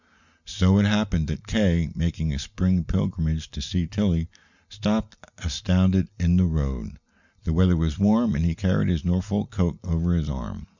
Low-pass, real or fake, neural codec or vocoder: 7.2 kHz; real; none